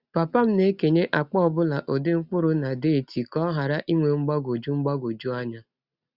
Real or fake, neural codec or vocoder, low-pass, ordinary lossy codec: real; none; 5.4 kHz; Opus, 64 kbps